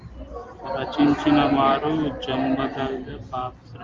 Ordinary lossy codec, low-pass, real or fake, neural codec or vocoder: Opus, 32 kbps; 7.2 kHz; real; none